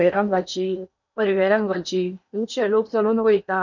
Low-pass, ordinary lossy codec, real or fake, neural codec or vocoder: 7.2 kHz; none; fake; codec, 16 kHz in and 24 kHz out, 0.8 kbps, FocalCodec, streaming, 65536 codes